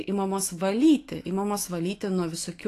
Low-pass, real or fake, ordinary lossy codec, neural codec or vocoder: 14.4 kHz; fake; AAC, 48 kbps; autoencoder, 48 kHz, 128 numbers a frame, DAC-VAE, trained on Japanese speech